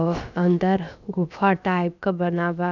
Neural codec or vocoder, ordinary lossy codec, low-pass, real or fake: codec, 16 kHz, about 1 kbps, DyCAST, with the encoder's durations; none; 7.2 kHz; fake